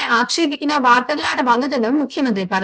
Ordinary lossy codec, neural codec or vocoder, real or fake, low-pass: none; codec, 16 kHz, about 1 kbps, DyCAST, with the encoder's durations; fake; none